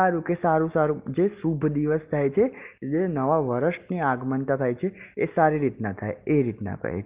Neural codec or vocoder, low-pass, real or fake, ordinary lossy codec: none; 3.6 kHz; real; Opus, 32 kbps